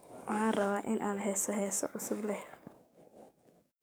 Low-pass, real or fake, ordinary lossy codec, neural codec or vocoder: none; fake; none; codec, 44.1 kHz, 7.8 kbps, DAC